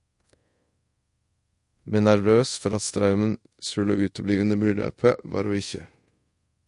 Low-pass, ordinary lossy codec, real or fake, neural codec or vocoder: 10.8 kHz; MP3, 48 kbps; fake; codec, 24 kHz, 0.5 kbps, DualCodec